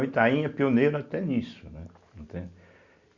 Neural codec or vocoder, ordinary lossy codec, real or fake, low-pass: none; Opus, 64 kbps; real; 7.2 kHz